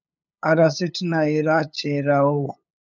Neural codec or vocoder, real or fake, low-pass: codec, 16 kHz, 8 kbps, FunCodec, trained on LibriTTS, 25 frames a second; fake; 7.2 kHz